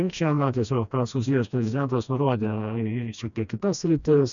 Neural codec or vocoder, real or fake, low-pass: codec, 16 kHz, 1 kbps, FreqCodec, smaller model; fake; 7.2 kHz